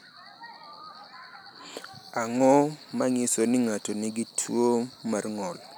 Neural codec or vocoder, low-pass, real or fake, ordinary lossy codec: none; none; real; none